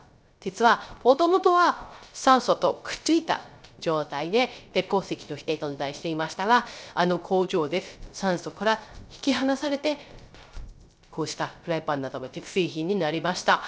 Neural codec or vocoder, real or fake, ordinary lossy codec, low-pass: codec, 16 kHz, 0.3 kbps, FocalCodec; fake; none; none